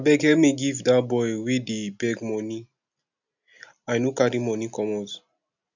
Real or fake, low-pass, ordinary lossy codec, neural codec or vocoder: real; 7.2 kHz; none; none